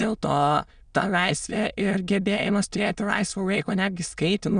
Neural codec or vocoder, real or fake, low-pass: autoencoder, 22.05 kHz, a latent of 192 numbers a frame, VITS, trained on many speakers; fake; 9.9 kHz